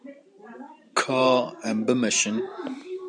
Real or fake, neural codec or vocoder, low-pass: fake; vocoder, 44.1 kHz, 128 mel bands every 512 samples, BigVGAN v2; 9.9 kHz